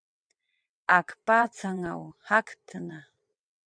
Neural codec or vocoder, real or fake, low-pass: vocoder, 22.05 kHz, 80 mel bands, WaveNeXt; fake; 9.9 kHz